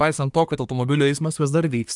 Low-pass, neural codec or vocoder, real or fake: 10.8 kHz; codec, 24 kHz, 1 kbps, SNAC; fake